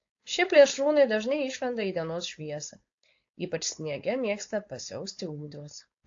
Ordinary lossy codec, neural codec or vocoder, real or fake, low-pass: AAC, 48 kbps; codec, 16 kHz, 4.8 kbps, FACodec; fake; 7.2 kHz